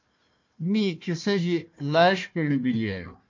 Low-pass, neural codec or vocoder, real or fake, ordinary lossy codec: 7.2 kHz; codec, 16 kHz, 1 kbps, FunCodec, trained on Chinese and English, 50 frames a second; fake; MP3, 48 kbps